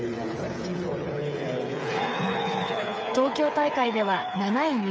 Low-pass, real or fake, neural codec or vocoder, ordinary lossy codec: none; fake; codec, 16 kHz, 8 kbps, FreqCodec, smaller model; none